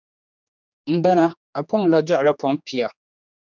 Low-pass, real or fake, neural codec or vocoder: 7.2 kHz; fake; codec, 16 kHz, 2 kbps, X-Codec, HuBERT features, trained on general audio